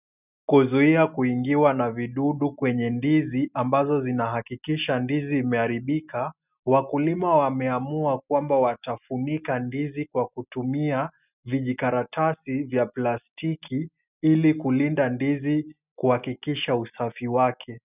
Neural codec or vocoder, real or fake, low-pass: none; real; 3.6 kHz